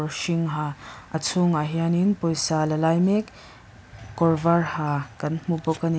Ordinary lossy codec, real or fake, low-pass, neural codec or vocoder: none; real; none; none